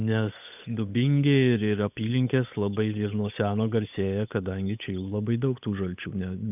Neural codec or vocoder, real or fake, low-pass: codec, 16 kHz, 8 kbps, FunCodec, trained on Chinese and English, 25 frames a second; fake; 3.6 kHz